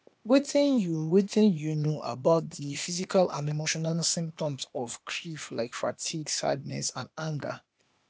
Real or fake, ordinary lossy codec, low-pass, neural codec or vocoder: fake; none; none; codec, 16 kHz, 0.8 kbps, ZipCodec